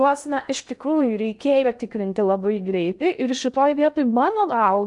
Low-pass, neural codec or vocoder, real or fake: 10.8 kHz; codec, 16 kHz in and 24 kHz out, 0.6 kbps, FocalCodec, streaming, 2048 codes; fake